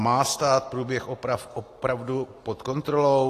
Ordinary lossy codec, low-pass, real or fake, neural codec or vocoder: AAC, 48 kbps; 14.4 kHz; real; none